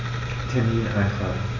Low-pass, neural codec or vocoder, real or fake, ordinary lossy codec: 7.2 kHz; none; real; none